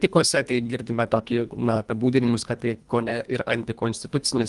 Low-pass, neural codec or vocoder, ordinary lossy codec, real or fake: 10.8 kHz; codec, 24 kHz, 1.5 kbps, HILCodec; Opus, 32 kbps; fake